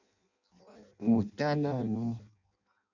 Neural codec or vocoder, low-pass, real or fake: codec, 16 kHz in and 24 kHz out, 0.6 kbps, FireRedTTS-2 codec; 7.2 kHz; fake